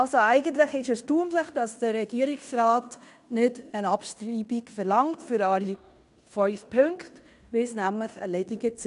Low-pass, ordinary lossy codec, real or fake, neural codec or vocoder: 10.8 kHz; none; fake; codec, 16 kHz in and 24 kHz out, 0.9 kbps, LongCat-Audio-Codec, fine tuned four codebook decoder